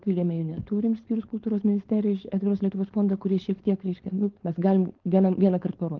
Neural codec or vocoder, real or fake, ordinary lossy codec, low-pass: codec, 16 kHz, 4.8 kbps, FACodec; fake; Opus, 16 kbps; 7.2 kHz